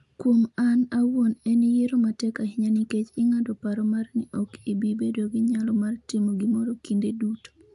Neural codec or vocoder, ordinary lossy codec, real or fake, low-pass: none; AAC, 96 kbps; real; 10.8 kHz